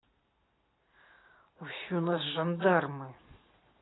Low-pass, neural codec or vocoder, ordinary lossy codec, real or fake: 7.2 kHz; none; AAC, 16 kbps; real